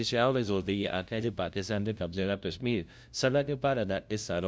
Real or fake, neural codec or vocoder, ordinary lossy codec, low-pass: fake; codec, 16 kHz, 0.5 kbps, FunCodec, trained on LibriTTS, 25 frames a second; none; none